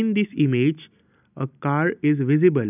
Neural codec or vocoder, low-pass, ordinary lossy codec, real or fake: none; 3.6 kHz; none; real